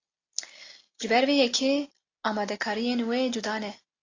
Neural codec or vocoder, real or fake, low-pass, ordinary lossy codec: none; real; 7.2 kHz; AAC, 32 kbps